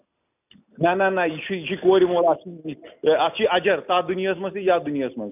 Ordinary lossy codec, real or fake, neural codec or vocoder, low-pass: none; real; none; 3.6 kHz